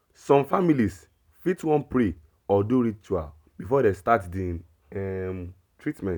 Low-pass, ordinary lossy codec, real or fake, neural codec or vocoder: none; none; real; none